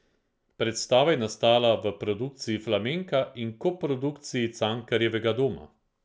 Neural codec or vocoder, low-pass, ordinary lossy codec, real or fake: none; none; none; real